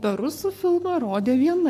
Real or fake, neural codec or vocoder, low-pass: fake; codec, 44.1 kHz, 7.8 kbps, Pupu-Codec; 14.4 kHz